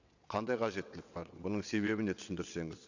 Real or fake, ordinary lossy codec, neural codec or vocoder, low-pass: fake; none; vocoder, 22.05 kHz, 80 mel bands, WaveNeXt; 7.2 kHz